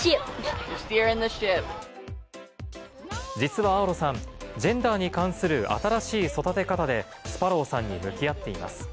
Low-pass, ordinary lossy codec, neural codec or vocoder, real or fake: none; none; none; real